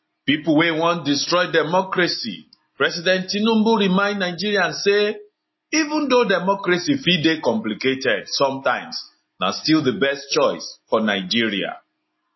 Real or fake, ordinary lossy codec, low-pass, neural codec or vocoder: real; MP3, 24 kbps; 7.2 kHz; none